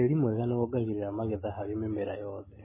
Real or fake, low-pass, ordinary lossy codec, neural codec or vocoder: real; 3.6 kHz; MP3, 16 kbps; none